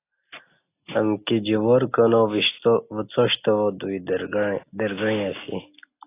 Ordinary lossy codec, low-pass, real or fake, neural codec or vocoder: AAC, 24 kbps; 3.6 kHz; real; none